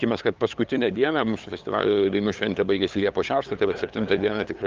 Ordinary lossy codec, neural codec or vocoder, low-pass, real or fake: Opus, 24 kbps; codec, 16 kHz, 8 kbps, FunCodec, trained on LibriTTS, 25 frames a second; 7.2 kHz; fake